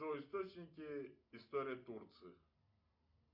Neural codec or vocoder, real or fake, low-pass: none; real; 5.4 kHz